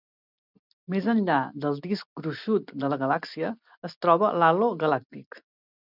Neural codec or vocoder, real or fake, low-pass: none; real; 5.4 kHz